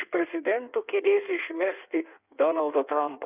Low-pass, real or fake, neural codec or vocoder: 3.6 kHz; fake; codec, 16 kHz in and 24 kHz out, 1.1 kbps, FireRedTTS-2 codec